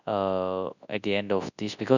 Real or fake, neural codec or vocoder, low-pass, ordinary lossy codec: fake; codec, 24 kHz, 0.9 kbps, WavTokenizer, large speech release; 7.2 kHz; none